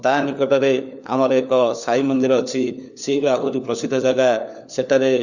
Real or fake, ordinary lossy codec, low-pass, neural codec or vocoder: fake; none; 7.2 kHz; codec, 16 kHz, 4 kbps, FunCodec, trained on LibriTTS, 50 frames a second